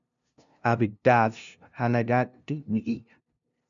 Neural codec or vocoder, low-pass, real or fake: codec, 16 kHz, 0.5 kbps, FunCodec, trained on LibriTTS, 25 frames a second; 7.2 kHz; fake